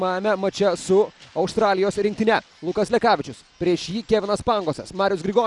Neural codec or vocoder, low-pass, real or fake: none; 10.8 kHz; real